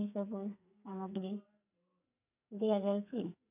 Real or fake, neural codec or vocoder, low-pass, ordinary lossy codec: fake; codec, 44.1 kHz, 2.6 kbps, SNAC; 3.6 kHz; none